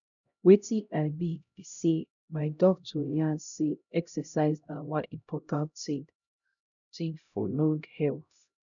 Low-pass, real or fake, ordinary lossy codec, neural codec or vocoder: 7.2 kHz; fake; none; codec, 16 kHz, 0.5 kbps, X-Codec, HuBERT features, trained on LibriSpeech